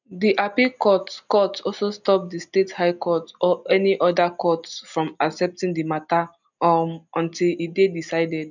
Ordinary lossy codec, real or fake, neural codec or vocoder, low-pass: none; real; none; 7.2 kHz